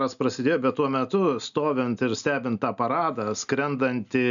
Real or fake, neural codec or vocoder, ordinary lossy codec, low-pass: real; none; MP3, 96 kbps; 7.2 kHz